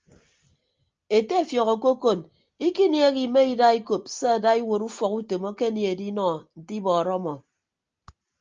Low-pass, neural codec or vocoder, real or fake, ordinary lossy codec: 7.2 kHz; none; real; Opus, 24 kbps